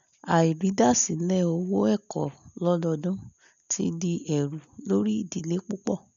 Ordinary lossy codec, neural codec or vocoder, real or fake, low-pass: none; none; real; 7.2 kHz